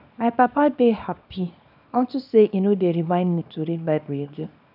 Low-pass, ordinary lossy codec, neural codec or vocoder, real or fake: 5.4 kHz; none; codec, 24 kHz, 0.9 kbps, WavTokenizer, small release; fake